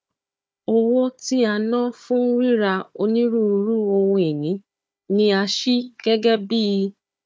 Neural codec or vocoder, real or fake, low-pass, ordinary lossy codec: codec, 16 kHz, 4 kbps, FunCodec, trained on Chinese and English, 50 frames a second; fake; none; none